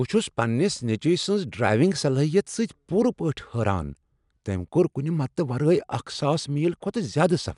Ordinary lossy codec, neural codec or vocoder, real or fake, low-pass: MP3, 96 kbps; none; real; 10.8 kHz